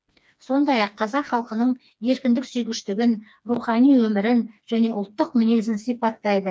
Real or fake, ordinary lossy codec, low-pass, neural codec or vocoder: fake; none; none; codec, 16 kHz, 2 kbps, FreqCodec, smaller model